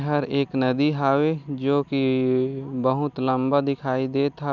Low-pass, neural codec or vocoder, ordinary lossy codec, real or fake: 7.2 kHz; none; none; real